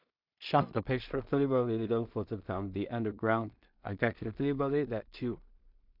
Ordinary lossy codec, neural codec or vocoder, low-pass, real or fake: MP3, 48 kbps; codec, 16 kHz in and 24 kHz out, 0.4 kbps, LongCat-Audio-Codec, two codebook decoder; 5.4 kHz; fake